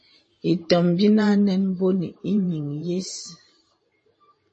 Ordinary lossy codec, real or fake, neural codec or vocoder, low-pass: MP3, 32 kbps; fake; vocoder, 44.1 kHz, 128 mel bands every 512 samples, BigVGAN v2; 10.8 kHz